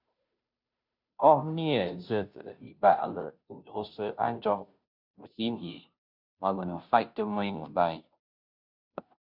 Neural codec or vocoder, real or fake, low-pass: codec, 16 kHz, 0.5 kbps, FunCodec, trained on Chinese and English, 25 frames a second; fake; 5.4 kHz